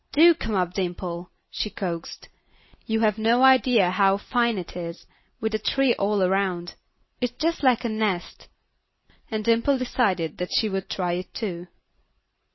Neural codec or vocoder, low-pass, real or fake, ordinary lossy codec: none; 7.2 kHz; real; MP3, 24 kbps